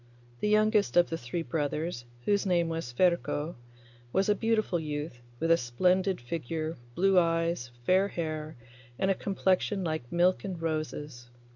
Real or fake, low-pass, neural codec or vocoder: real; 7.2 kHz; none